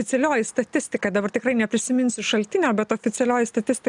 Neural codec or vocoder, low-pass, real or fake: none; 10.8 kHz; real